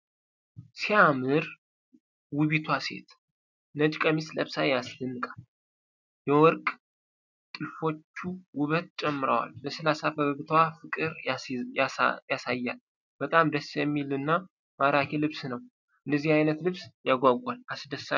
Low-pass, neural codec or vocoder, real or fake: 7.2 kHz; none; real